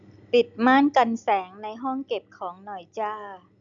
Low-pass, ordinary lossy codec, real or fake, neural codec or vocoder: 7.2 kHz; none; real; none